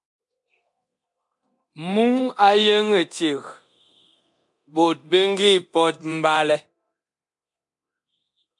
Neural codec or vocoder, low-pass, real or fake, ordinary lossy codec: codec, 24 kHz, 0.9 kbps, DualCodec; 10.8 kHz; fake; MP3, 64 kbps